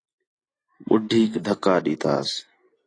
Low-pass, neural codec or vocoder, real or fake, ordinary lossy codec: 9.9 kHz; none; real; AAC, 32 kbps